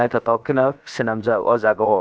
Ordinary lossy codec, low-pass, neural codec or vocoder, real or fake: none; none; codec, 16 kHz, about 1 kbps, DyCAST, with the encoder's durations; fake